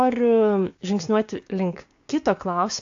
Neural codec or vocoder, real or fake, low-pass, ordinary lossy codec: codec, 16 kHz, 6 kbps, DAC; fake; 7.2 kHz; AAC, 48 kbps